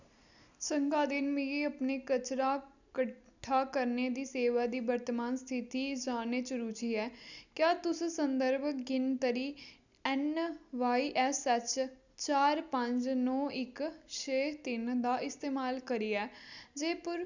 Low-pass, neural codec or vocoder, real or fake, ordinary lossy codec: 7.2 kHz; none; real; none